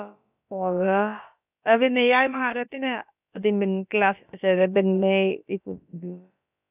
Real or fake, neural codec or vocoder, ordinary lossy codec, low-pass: fake; codec, 16 kHz, about 1 kbps, DyCAST, with the encoder's durations; none; 3.6 kHz